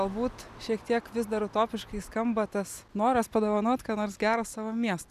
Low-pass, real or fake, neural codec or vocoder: 14.4 kHz; real; none